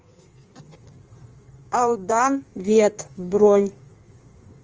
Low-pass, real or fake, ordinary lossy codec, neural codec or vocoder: 7.2 kHz; fake; Opus, 24 kbps; codec, 16 kHz in and 24 kHz out, 1.1 kbps, FireRedTTS-2 codec